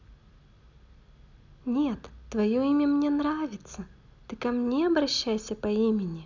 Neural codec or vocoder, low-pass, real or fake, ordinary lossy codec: none; 7.2 kHz; real; none